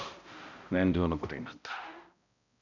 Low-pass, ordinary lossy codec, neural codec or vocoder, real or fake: 7.2 kHz; none; codec, 16 kHz, 0.5 kbps, X-Codec, HuBERT features, trained on balanced general audio; fake